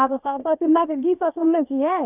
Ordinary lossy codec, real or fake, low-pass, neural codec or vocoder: none; fake; 3.6 kHz; codec, 16 kHz, about 1 kbps, DyCAST, with the encoder's durations